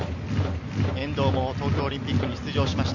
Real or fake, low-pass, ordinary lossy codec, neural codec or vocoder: real; 7.2 kHz; none; none